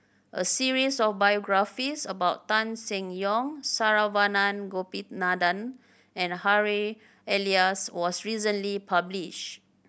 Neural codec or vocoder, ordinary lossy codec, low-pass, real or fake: none; none; none; real